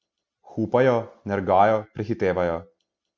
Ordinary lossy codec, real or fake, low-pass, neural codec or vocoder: none; real; none; none